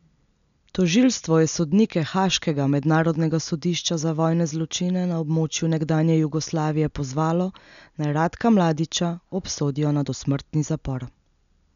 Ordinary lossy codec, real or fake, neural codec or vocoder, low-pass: none; real; none; 7.2 kHz